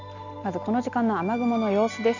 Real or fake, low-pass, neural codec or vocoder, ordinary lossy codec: real; 7.2 kHz; none; none